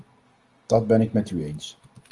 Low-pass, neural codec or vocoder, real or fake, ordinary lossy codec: 10.8 kHz; none; real; Opus, 24 kbps